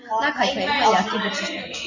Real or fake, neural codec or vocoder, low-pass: real; none; 7.2 kHz